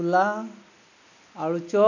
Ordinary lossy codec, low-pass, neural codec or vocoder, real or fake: none; 7.2 kHz; none; real